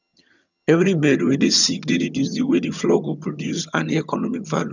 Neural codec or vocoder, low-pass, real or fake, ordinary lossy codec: vocoder, 22.05 kHz, 80 mel bands, HiFi-GAN; 7.2 kHz; fake; none